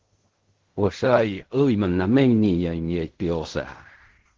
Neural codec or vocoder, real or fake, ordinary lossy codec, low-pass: codec, 16 kHz in and 24 kHz out, 0.4 kbps, LongCat-Audio-Codec, fine tuned four codebook decoder; fake; Opus, 16 kbps; 7.2 kHz